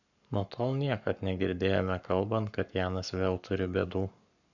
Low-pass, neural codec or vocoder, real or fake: 7.2 kHz; codec, 44.1 kHz, 7.8 kbps, Pupu-Codec; fake